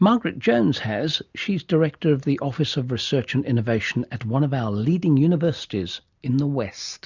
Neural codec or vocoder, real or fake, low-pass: none; real; 7.2 kHz